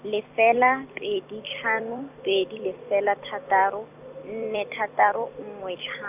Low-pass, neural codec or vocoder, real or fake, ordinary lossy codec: 3.6 kHz; vocoder, 44.1 kHz, 128 mel bands every 256 samples, BigVGAN v2; fake; none